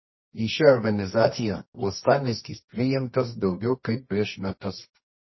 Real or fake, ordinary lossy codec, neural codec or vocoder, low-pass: fake; MP3, 24 kbps; codec, 24 kHz, 0.9 kbps, WavTokenizer, medium music audio release; 7.2 kHz